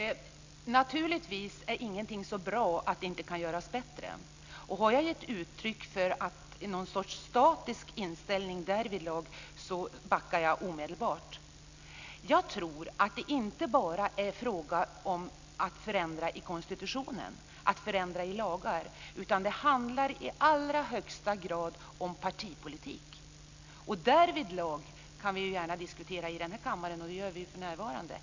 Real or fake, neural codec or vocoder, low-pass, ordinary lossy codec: real; none; 7.2 kHz; Opus, 64 kbps